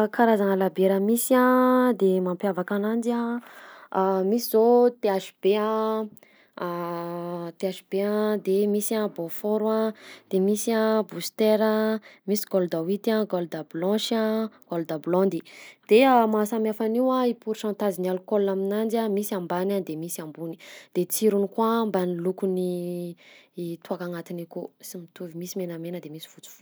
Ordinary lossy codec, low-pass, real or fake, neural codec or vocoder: none; none; real; none